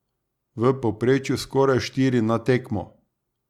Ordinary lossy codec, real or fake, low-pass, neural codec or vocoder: Opus, 64 kbps; real; 19.8 kHz; none